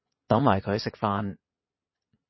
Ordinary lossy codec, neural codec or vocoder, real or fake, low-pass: MP3, 24 kbps; vocoder, 22.05 kHz, 80 mel bands, Vocos; fake; 7.2 kHz